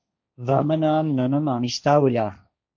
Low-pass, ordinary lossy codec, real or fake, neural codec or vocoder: 7.2 kHz; MP3, 48 kbps; fake; codec, 16 kHz, 1.1 kbps, Voila-Tokenizer